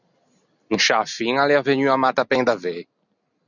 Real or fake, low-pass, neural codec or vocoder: real; 7.2 kHz; none